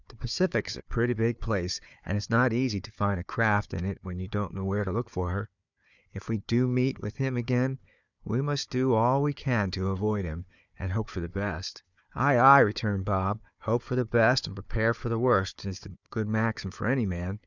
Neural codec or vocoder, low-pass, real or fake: codec, 16 kHz, 4 kbps, FunCodec, trained on Chinese and English, 50 frames a second; 7.2 kHz; fake